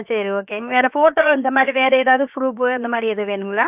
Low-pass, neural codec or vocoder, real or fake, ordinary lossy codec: 3.6 kHz; codec, 16 kHz, about 1 kbps, DyCAST, with the encoder's durations; fake; AAC, 32 kbps